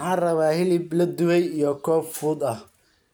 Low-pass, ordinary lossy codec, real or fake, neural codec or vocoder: none; none; real; none